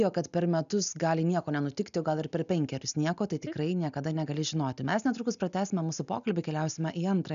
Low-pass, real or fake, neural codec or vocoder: 7.2 kHz; real; none